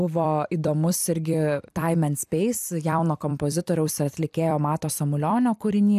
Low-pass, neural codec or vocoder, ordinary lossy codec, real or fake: 14.4 kHz; vocoder, 44.1 kHz, 128 mel bands every 256 samples, BigVGAN v2; AAC, 96 kbps; fake